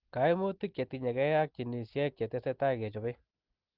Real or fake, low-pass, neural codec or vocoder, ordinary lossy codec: real; 5.4 kHz; none; Opus, 16 kbps